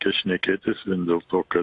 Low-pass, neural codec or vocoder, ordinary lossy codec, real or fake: 10.8 kHz; none; AAC, 48 kbps; real